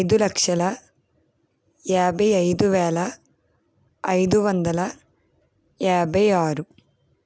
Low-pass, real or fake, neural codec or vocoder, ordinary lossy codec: none; real; none; none